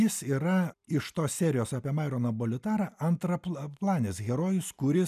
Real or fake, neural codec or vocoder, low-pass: real; none; 14.4 kHz